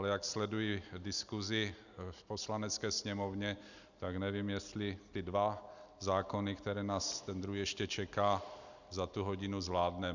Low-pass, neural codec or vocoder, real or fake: 7.2 kHz; none; real